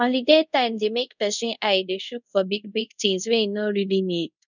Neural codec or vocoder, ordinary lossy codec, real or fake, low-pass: codec, 24 kHz, 0.5 kbps, DualCodec; none; fake; 7.2 kHz